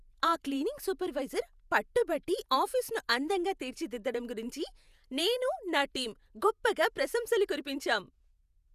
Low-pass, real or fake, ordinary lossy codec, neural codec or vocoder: 14.4 kHz; fake; none; vocoder, 48 kHz, 128 mel bands, Vocos